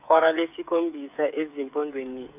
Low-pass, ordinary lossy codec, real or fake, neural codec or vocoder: 3.6 kHz; none; fake; codec, 16 kHz, 8 kbps, FreqCodec, smaller model